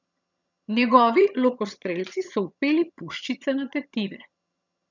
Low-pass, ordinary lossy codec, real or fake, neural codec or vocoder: 7.2 kHz; none; fake; vocoder, 22.05 kHz, 80 mel bands, HiFi-GAN